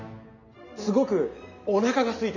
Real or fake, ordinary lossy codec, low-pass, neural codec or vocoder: real; none; 7.2 kHz; none